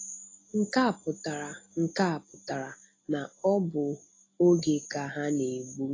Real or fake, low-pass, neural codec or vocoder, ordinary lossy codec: real; 7.2 kHz; none; AAC, 32 kbps